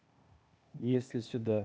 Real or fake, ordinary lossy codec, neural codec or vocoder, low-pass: fake; none; codec, 16 kHz, 0.8 kbps, ZipCodec; none